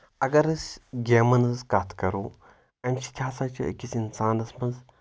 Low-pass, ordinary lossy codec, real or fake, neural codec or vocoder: none; none; real; none